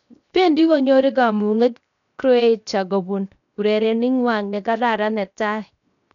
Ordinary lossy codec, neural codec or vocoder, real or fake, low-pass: none; codec, 16 kHz, 0.7 kbps, FocalCodec; fake; 7.2 kHz